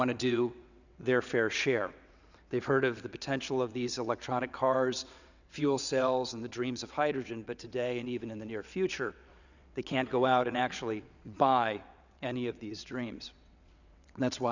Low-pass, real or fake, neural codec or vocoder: 7.2 kHz; fake; vocoder, 22.05 kHz, 80 mel bands, WaveNeXt